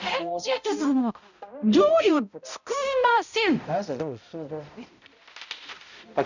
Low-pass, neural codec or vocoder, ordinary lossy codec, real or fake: 7.2 kHz; codec, 16 kHz, 0.5 kbps, X-Codec, HuBERT features, trained on balanced general audio; none; fake